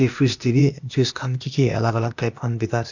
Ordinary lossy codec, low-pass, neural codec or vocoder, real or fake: none; 7.2 kHz; codec, 16 kHz, 0.8 kbps, ZipCodec; fake